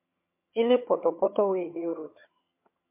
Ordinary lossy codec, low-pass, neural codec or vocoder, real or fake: MP3, 24 kbps; 3.6 kHz; vocoder, 22.05 kHz, 80 mel bands, HiFi-GAN; fake